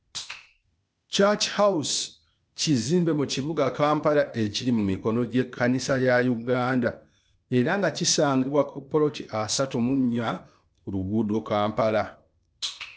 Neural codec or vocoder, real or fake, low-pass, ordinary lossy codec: codec, 16 kHz, 0.8 kbps, ZipCodec; fake; none; none